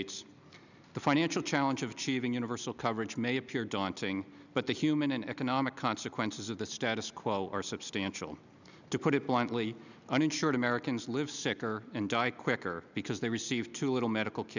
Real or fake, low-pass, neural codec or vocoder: real; 7.2 kHz; none